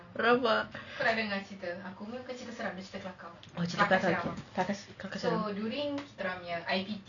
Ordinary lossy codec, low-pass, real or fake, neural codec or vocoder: none; 7.2 kHz; real; none